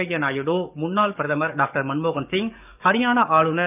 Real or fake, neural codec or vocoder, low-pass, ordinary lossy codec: fake; codec, 44.1 kHz, 7.8 kbps, DAC; 3.6 kHz; none